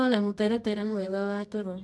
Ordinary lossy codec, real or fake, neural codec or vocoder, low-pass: none; fake; codec, 24 kHz, 0.9 kbps, WavTokenizer, medium music audio release; none